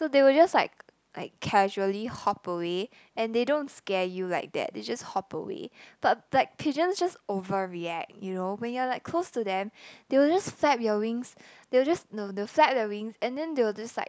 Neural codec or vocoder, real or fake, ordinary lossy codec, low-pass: none; real; none; none